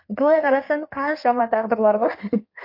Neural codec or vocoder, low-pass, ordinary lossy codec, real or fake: codec, 16 kHz in and 24 kHz out, 1.1 kbps, FireRedTTS-2 codec; 5.4 kHz; MP3, 32 kbps; fake